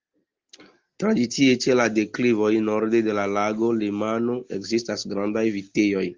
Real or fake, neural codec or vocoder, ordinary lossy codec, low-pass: real; none; Opus, 32 kbps; 7.2 kHz